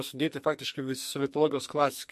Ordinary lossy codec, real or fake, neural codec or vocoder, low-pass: MP3, 64 kbps; fake; codec, 44.1 kHz, 2.6 kbps, SNAC; 14.4 kHz